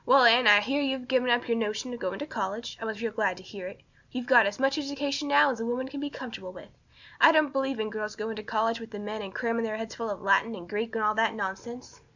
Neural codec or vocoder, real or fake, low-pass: none; real; 7.2 kHz